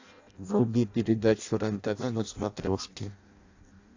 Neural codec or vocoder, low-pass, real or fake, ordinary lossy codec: codec, 16 kHz in and 24 kHz out, 0.6 kbps, FireRedTTS-2 codec; 7.2 kHz; fake; AAC, 48 kbps